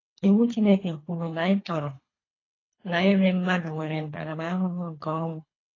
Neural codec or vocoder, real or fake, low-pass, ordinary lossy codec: codec, 24 kHz, 3 kbps, HILCodec; fake; 7.2 kHz; AAC, 32 kbps